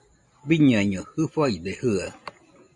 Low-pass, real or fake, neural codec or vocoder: 10.8 kHz; real; none